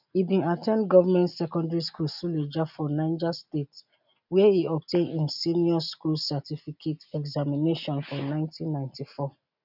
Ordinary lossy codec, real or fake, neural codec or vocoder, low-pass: none; real; none; 5.4 kHz